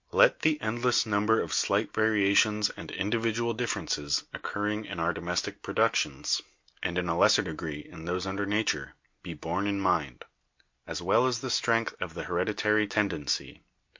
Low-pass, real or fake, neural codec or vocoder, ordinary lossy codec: 7.2 kHz; real; none; MP3, 48 kbps